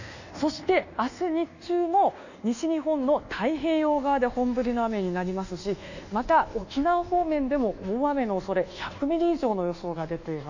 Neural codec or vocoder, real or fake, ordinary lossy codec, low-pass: codec, 24 kHz, 1.2 kbps, DualCodec; fake; none; 7.2 kHz